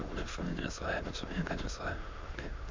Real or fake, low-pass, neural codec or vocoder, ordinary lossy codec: fake; 7.2 kHz; autoencoder, 22.05 kHz, a latent of 192 numbers a frame, VITS, trained on many speakers; MP3, 64 kbps